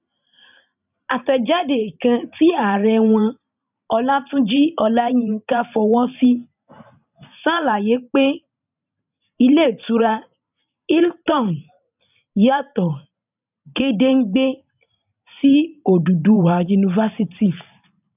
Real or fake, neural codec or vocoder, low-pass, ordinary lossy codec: fake; vocoder, 44.1 kHz, 128 mel bands every 512 samples, BigVGAN v2; 3.6 kHz; none